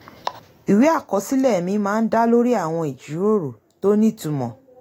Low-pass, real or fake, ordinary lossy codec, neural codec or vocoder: 19.8 kHz; real; AAC, 48 kbps; none